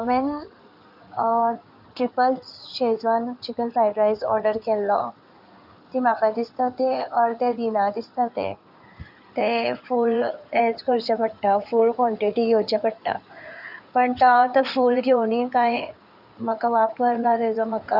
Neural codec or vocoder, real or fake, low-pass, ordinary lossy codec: codec, 16 kHz in and 24 kHz out, 2.2 kbps, FireRedTTS-2 codec; fake; 5.4 kHz; none